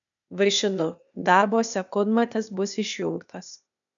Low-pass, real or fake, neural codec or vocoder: 7.2 kHz; fake; codec, 16 kHz, 0.8 kbps, ZipCodec